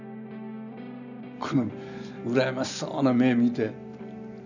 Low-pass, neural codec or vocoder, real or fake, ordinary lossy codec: 7.2 kHz; none; real; none